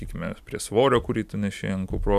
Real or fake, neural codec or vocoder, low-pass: real; none; 14.4 kHz